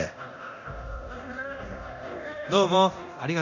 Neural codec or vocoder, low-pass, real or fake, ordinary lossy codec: codec, 24 kHz, 0.9 kbps, DualCodec; 7.2 kHz; fake; none